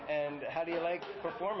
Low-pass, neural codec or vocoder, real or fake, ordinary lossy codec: 7.2 kHz; none; real; MP3, 24 kbps